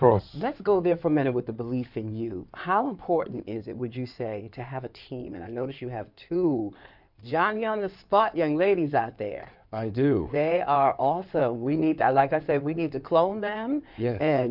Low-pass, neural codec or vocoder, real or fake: 5.4 kHz; codec, 16 kHz in and 24 kHz out, 2.2 kbps, FireRedTTS-2 codec; fake